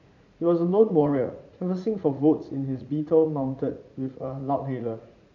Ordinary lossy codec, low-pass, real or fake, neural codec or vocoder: none; 7.2 kHz; fake; vocoder, 44.1 kHz, 80 mel bands, Vocos